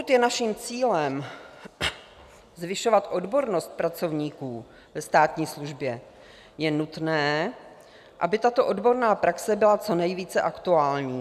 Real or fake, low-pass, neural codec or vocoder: real; 14.4 kHz; none